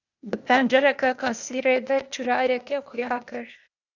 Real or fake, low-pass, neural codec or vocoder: fake; 7.2 kHz; codec, 16 kHz, 0.8 kbps, ZipCodec